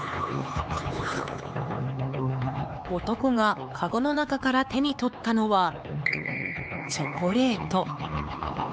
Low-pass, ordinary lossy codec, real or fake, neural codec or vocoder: none; none; fake; codec, 16 kHz, 4 kbps, X-Codec, HuBERT features, trained on LibriSpeech